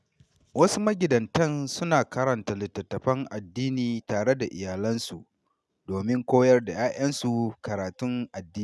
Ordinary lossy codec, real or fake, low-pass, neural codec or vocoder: none; real; none; none